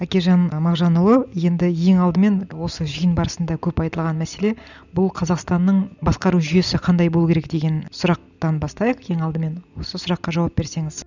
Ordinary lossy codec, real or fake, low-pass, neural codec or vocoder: none; real; 7.2 kHz; none